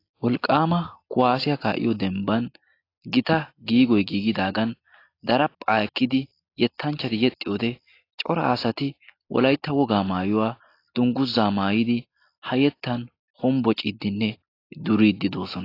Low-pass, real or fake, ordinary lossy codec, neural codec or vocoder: 5.4 kHz; real; AAC, 32 kbps; none